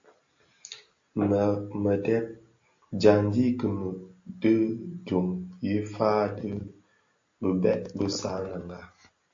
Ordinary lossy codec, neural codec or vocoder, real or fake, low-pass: AAC, 48 kbps; none; real; 7.2 kHz